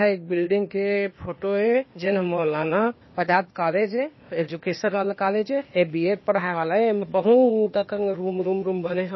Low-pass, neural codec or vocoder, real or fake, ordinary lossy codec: 7.2 kHz; codec, 16 kHz, 0.8 kbps, ZipCodec; fake; MP3, 24 kbps